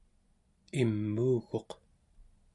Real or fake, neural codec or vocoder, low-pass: real; none; 10.8 kHz